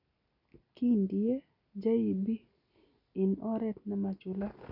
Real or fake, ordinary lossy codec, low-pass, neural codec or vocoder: real; none; 5.4 kHz; none